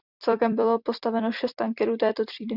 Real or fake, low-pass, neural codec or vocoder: fake; 5.4 kHz; vocoder, 44.1 kHz, 128 mel bands every 256 samples, BigVGAN v2